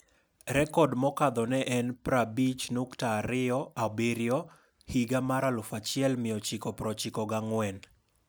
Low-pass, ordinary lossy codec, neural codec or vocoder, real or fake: none; none; none; real